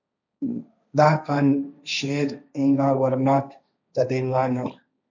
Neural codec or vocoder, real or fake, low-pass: codec, 16 kHz, 1.1 kbps, Voila-Tokenizer; fake; 7.2 kHz